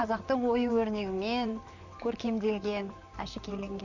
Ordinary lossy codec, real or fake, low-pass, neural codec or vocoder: none; fake; 7.2 kHz; vocoder, 44.1 kHz, 128 mel bands, Pupu-Vocoder